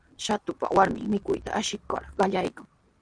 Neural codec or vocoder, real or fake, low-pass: none; real; 9.9 kHz